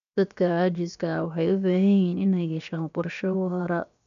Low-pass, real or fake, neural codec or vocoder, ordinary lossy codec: 7.2 kHz; fake; codec, 16 kHz, 0.7 kbps, FocalCodec; none